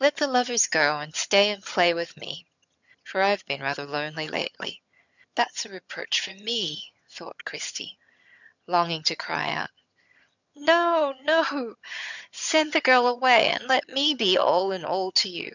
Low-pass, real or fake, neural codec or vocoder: 7.2 kHz; fake; vocoder, 22.05 kHz, 80 mel bands, HiFi-GAN